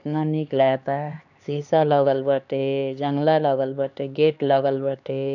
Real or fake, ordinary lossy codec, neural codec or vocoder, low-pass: fake; none; codec, 16 kHz, 2 kbps, X-Codec, HuBERT features, trained on LibriSpeech; 7.2 kHz